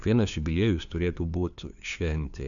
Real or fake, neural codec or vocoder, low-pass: fake; codec, 16 kHz, 2 kbps, FunCodec, trained on LibriTTS, 25 frames a second; 7.2 kHz